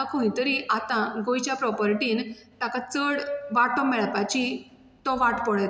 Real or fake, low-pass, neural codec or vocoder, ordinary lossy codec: real; none; none; none